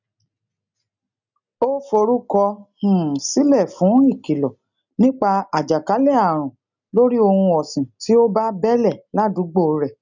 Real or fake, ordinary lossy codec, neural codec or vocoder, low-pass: real; none; none; 7.2 kHz